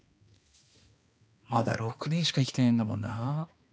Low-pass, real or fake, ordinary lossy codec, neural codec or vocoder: none; fake; none; codec, 16 kHz, 2 kbps, X-Codec, HuBERT features, trained on balanced general audio